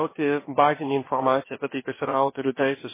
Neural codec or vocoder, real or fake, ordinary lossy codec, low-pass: codec, 16 kHz, about 1 kbps, DyCAST, with the encoder's durations; fake; MP3, 16 kbps; 3.6 kHz